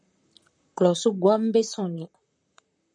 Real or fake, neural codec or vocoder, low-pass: fake; vocoder, 44.1 kHz, 128 mel bands, Pupu-Vocoder; 9.9 kHz